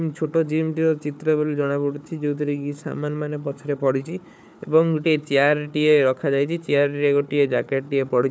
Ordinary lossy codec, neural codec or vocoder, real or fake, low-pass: none; codec, 16 kHz, 4 kbps, FunCodec, trained on Chinese and English, 50 frames a second; fake; none